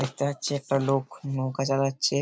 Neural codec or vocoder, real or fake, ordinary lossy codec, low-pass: none; real; none; none